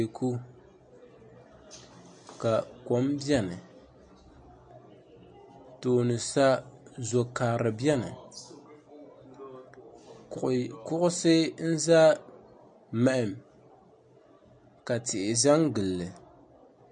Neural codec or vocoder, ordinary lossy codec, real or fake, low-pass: none; MP3, 48 kbps; real; 9.9 kHz